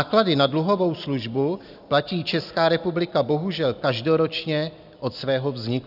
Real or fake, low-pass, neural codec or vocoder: real; 5.4 kHz; none